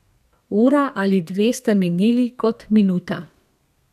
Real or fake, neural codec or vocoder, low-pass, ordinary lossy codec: fake; codec, 32 kHz, 1.9 kbps, SNAC; 14.4 kHz; none